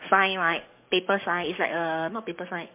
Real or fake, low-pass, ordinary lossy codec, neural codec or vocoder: fake; 3.6 kHz; MP3, 24 kbps; codec, 44.1 kHz, 7.8 kbps, DAC